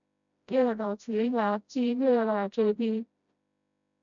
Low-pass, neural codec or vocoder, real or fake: 7.2 kHz; codec, 16 kHz, 0.5 kbps, FreqCodec, smaller model; fake